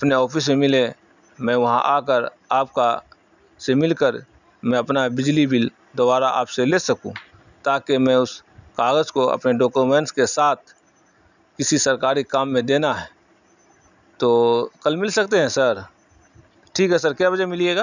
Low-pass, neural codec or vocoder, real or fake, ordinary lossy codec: 7.2 kHz; none; real; none